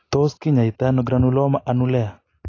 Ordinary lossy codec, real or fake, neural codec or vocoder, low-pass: AAC, 32 kbps; real; none; 7.2 kHz